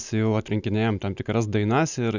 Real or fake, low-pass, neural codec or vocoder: real; 7.2 kHz; none